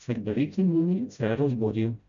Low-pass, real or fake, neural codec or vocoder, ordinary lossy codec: 7.2 kHz; fake; codec, 16 kHz, 0.5 kbps, FreqCodec, smaller model; none